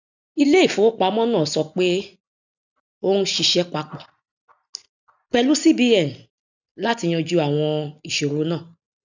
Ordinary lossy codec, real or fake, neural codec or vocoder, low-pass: none; real; none; 7.2 kHz